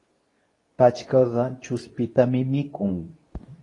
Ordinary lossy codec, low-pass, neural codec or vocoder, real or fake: AAC, 32 kbps; 10.8 kHz; codec, 24 kHz, 0.9 kbps, WavTokenizer, medium speech release version 2; fake